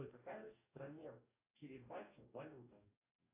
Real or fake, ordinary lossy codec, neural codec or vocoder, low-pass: fake; AAC, 24 kbps; codec, 44.1 kHz, 2.6 kbps, DAC; 3.6 kHz